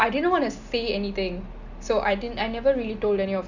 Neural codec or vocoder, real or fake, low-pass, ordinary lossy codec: none; real; 7.2 kHz; none